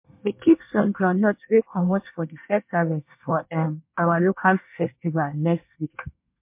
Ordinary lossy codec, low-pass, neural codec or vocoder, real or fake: MP3, 32 kbps; 3.6 kHz; codec, 24 kHz, 1 kbps, SNAC; fake